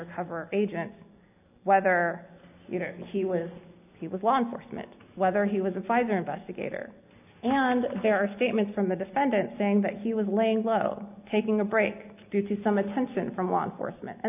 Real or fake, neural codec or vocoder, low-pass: real; none; 3.6 kHz